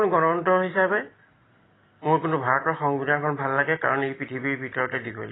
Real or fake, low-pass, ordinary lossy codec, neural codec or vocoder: real; 7.2 kHz; AAC, 16 kbps; none